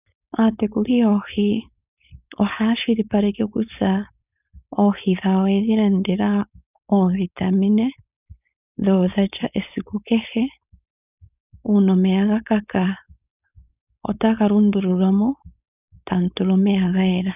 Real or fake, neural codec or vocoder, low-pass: fake; codec, 16 kHz, 4.8 kbps, FACodec; 3.6 kHz